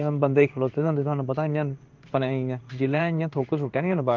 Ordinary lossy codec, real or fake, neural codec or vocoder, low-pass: Opus, 24 kbps; fake; codec, 16 kHz in and 24 kHz out, 1 kbps, XY-Tokenizer; 7.2 kHz